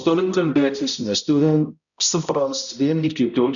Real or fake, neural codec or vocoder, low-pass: fake; codec, 16 kHz, 0.5 kbps, X-Codec, HuBERT features, trained on balanced general audio; 7.2 kHz